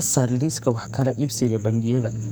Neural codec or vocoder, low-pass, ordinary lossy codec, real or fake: codec, 44.1 kHz, 2.6 kbps, SNAC; none; none; fake